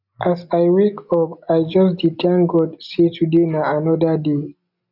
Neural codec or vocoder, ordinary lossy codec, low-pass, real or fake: none; none; 5.4 kHz; real